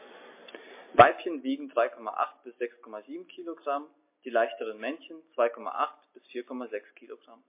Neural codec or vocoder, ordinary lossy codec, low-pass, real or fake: none; MP3, 24 kbps; 3.6 kHz; real